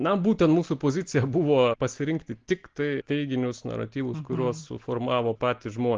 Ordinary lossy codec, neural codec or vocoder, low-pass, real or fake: Opus, 16 kbps; none; 7.2 kHz; real